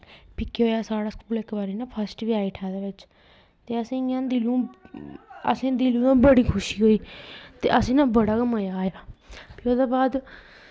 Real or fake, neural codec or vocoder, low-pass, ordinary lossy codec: real; none; none; none